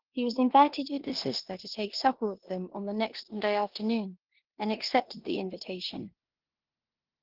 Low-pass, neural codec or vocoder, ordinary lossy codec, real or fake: 5.4 kHz; codec, 16 kHz in and 24 kHz out, 0.9 kbps, LongCat-Audio-Codec, four codebook decoder; Opus, 16 kbps; fake